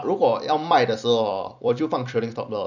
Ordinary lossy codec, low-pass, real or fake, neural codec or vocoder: none; 7.2 kHz; fake; vocoder, 44.1 kHz, 128 mel bands every 256 samples, BigVGAN v2